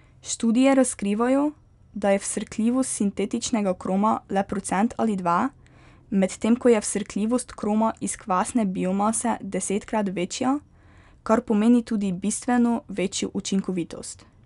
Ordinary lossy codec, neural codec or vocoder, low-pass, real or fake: none; none; 10.8 kHz; real